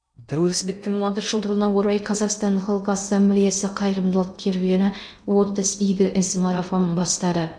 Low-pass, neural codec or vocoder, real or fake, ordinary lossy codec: 9.9 kHz; codec, 16 kHz in and 24 kHz out, 0.6 kbps, FocalCodec, streaming, 2048 codes; fake; none